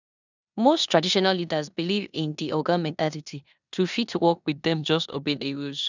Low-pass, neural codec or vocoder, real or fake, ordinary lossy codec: 7.2 kHz; codec, 16 kHz in and 24 kHz out, 0.9 kbps, LongCat-Audio-Codec, four codebook decoder; fake; none